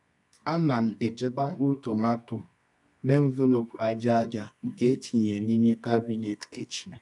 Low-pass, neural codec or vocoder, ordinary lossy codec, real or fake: 10.8 kHz; codec, 24 kHz, 0.9 kbps, WavTokenizer, medium music audio release; none; fake